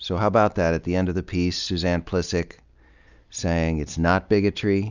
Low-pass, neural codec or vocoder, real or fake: 7.2 kHz; none; real